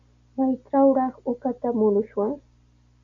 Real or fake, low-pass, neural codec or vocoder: real; 7.2 kHz; none